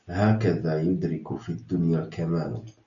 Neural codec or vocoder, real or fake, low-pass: none; real; 7.2 kHz